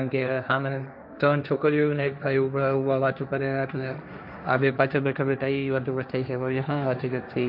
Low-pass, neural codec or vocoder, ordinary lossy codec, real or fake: 5.4 kHz; codec, 16 kHz, 1.1 kbps, Voila-Tokenizer; none; fake